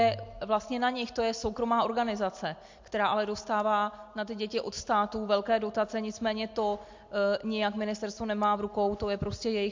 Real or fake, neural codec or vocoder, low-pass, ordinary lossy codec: real; none; 7.2 kHz; MP3, 48 kbps